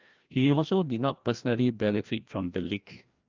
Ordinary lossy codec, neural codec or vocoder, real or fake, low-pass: Opus, 24 kbps; codec, 16 kHz, 1 kbps, FreqCodec, larger model; fake; 7.2 kHz